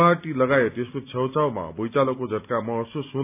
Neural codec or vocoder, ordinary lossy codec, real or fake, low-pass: none; none; real; 3.6 kHz